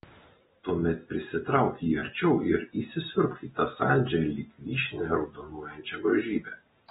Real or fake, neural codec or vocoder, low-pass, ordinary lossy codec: real; none; 19.8 kHz; AAC, 16 kbps